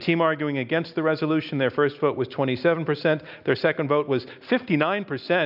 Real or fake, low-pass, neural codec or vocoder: real; 5.4 kHz; none